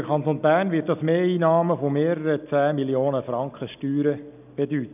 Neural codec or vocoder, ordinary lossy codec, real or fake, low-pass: none; none; real; 3.6 kHz